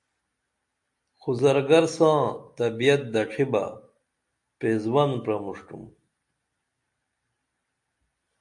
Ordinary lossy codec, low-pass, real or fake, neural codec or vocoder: AAC, 64 kbps; 10.8 kHz; real; none